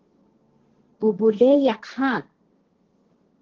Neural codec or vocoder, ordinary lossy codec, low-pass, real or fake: codec, 16 kHz, 1.1 kbps, Voila-Tokenizer; Opus, 16 kbps; 7.2 kHz; fake